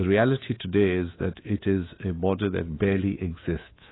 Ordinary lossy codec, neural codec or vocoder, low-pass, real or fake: AAC, 16 kbps; codec, 24 kHz, 3.1 kbps, DualCodec; 7.2 kHz; fake